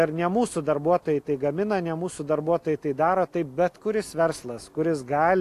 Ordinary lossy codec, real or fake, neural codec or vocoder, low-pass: AAC, 64 kbps; real; none; 14.4 kHz